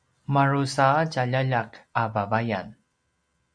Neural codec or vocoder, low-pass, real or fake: none; 9.9 kHz; real